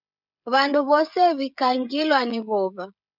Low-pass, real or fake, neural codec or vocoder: 5.4 kHz; fake; codec, 16 kHz, 16 kbps, FreqCodec, smaller model